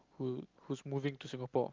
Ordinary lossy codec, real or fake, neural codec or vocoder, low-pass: Opus, 32 kbps; real; none; 7.2 kHz